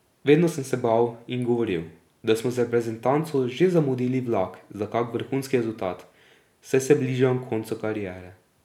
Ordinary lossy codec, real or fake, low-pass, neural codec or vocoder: none; real; 19.8 kHz; none